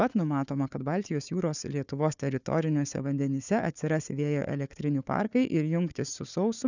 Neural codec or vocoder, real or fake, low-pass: codec, 16 kHz, 4 kbps, FunCodec, trained on Chinese and English, 50 frames a second; fake; 7.2 kHz